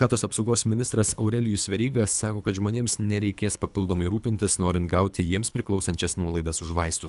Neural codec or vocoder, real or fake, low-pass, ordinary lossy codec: codec, 24 kHz, 3 kbps, HILCodec; fake; 10.8 kHz; AAC, 96 kbps